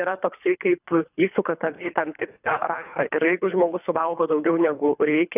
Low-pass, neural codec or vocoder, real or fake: 3.6 kHz; codec, 24 kHz, 3 kbps, HILCodec; fake